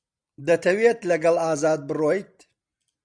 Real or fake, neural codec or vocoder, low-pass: real; none; 9.9 kHz